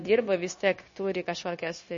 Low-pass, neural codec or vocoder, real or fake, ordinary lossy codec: 7.2 kHz; codec, 16 kHz, 0.9 kbps, LongCat-Audio-Codec; fake; MP3, 32 kbps